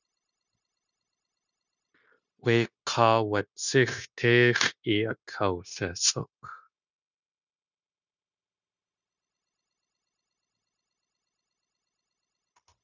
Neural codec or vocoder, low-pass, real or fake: codec, 16 kHz, 0.9 kbps, LongCat-Audio-Codec; 7.2 kHz; fake